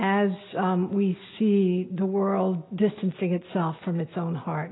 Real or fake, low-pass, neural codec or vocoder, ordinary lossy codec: real; 7.2 kHz; none; AAC, 16 kbps